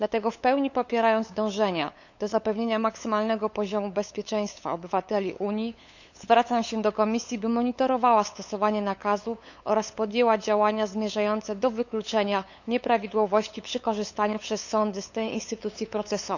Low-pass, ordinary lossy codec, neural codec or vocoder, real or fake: 7.2 kHz; none; codec, 16 kHz, 8 kbps, FunCodec, trained on LibriTTS, 25 frames a second; fake